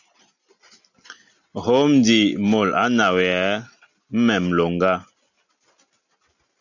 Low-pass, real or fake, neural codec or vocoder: 7.2 kHz; real; none